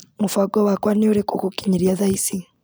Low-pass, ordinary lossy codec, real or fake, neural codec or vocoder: none; none; real; none